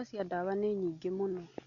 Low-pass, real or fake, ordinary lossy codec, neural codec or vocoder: 7.2 kHz; real; none; none